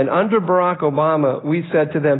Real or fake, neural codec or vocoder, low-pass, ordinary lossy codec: real; none; 7.2 kHz; AAC, 16 kbps